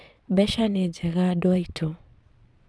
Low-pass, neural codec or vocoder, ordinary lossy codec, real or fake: none; vocoder, 22.05 kHz, 80 mel bands, Vocos; none; fake